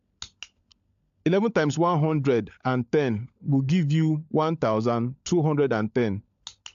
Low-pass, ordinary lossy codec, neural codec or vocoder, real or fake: 7.2 kHz; AAC, 64 kbps; codec, 16 kHz, 16 kbps, FunCodec, trained on LibriTTS, 50 frames a second; fake